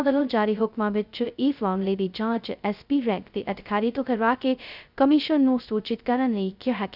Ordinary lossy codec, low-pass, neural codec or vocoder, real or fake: none; 5.4 kHz; codec, 16 kHz, 0.2 kbps, FocalCodec; fake